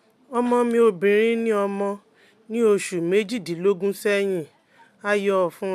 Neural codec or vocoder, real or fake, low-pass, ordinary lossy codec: none; real; 14.4 kHz; MP3, 96 kbps